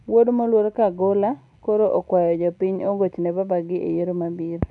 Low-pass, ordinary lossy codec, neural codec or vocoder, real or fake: 10.8 kHz; none; none; real